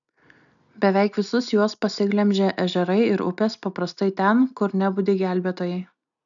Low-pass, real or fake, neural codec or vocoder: 7.2 kHz; real; none